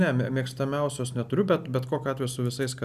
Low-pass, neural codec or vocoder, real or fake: 14.4 kHz; none; real